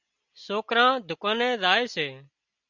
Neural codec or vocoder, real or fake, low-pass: none; real; 7.2 kHz